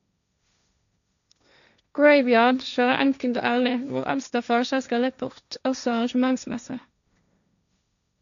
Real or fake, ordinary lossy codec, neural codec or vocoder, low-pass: fake; none; codec, 16 kHz, 1.1 kbps, Voila-Tokenizer; 7.2 kHz